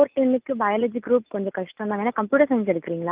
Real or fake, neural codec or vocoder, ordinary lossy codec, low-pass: real; none; Opus, 32 kbps; 3.6 kHz